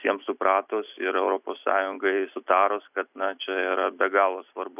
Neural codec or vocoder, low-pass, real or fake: none; 3.6 kHz; real